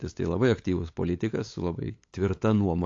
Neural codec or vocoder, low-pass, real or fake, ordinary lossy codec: codec, 16 kHz, 8 kbps, FunCodec, trained on LibriTTS, 25 frames a second; 7.2 kHz; fake; AAC, 48 kbps